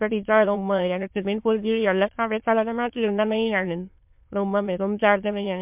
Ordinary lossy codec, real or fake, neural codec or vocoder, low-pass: MP3, 32 kbps; fake; autoencoder, 22.05 kHz, a latent of 192 numbers a frame, VITS, trained on many speakers; 3.6 kHz